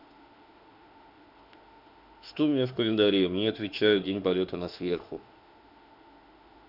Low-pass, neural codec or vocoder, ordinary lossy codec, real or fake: 5.4 kHz; autoencoder, 48 kHz, 32 numbers a frame, DAC-VAE, trained on Japanese speech; none; fake